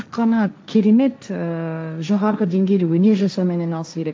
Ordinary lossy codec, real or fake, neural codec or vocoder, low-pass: none; fake; codec, 16 kHz, 1.1 kbps, Voila-Tokenizer; none